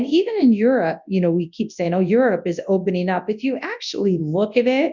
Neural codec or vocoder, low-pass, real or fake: codec, 24 kHz, 0.9 kbps, WavTokenizer, large speech release; 7.2 kHz; fake